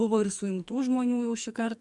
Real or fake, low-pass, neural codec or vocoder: fake; 10.8 kHz; codec, 44.1 kHz, 2.6 kbps, SNAC